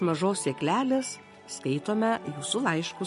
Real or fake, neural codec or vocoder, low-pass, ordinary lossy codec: real; none; 14.4 kHz; MP3, 48 kbps